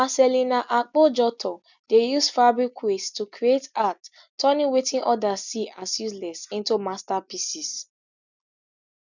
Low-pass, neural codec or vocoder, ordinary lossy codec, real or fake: 7.2 kHz; none; none; real